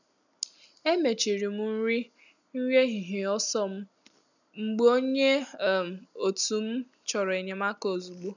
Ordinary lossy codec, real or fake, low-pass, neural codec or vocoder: none; real; 7.2 kHz; none